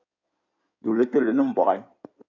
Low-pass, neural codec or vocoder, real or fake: 7.2 kHz; codec, 16 kHz in and 24 kHz out, 2.2 kbps, FireRedTTS-2 codec; fake